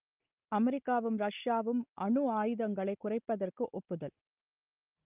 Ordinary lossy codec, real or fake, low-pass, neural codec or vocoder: Opus, 32 kbps; real; 3.6 kHz; none